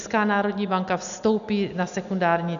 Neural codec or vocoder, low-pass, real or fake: none; 7.2 kHz; real